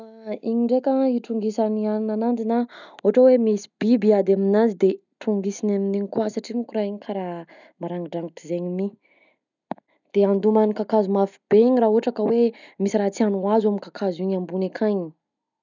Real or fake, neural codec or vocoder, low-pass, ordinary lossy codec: real; none; 7.2 kHz; none